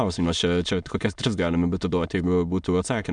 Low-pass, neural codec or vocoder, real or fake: 9.9 kHz; autoencoder, 22.05 kHz, a latent of 192 numbers a frame, VITS, trained on many speakers; fake